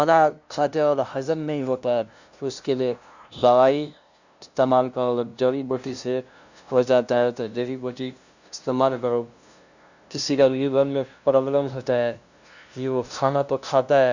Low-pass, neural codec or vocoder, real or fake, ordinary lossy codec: 7.2 kHz; codec, 16 kHz, 0.5 kbps, FunCodec, trained on LibriTTS, 25 frames a second; fake; Opus, 64 kbps